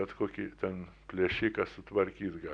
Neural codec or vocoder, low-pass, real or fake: none; 9.9 kHz; real